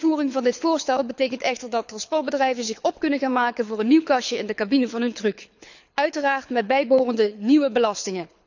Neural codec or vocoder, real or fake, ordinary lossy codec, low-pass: codec, 24 kHz, 6 kbps, HILCodec; fake; none; 7.2 kHz